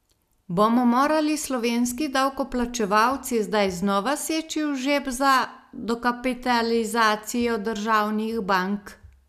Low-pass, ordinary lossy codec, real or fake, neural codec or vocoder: 14.4 kHz; none; real; none